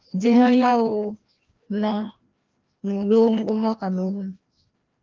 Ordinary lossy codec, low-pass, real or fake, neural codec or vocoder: Opus, 32 kbps; 7.2 kHz; fake; codec, 16 kHz, 1 kbps, FreqCodec, larger model